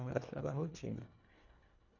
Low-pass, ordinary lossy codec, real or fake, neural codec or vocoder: 7.2 kHz; none; fake; codec, 24 kHz, 1.5 kbps, HILCodec